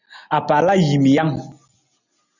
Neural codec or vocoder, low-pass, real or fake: none; 7.2 kHz; real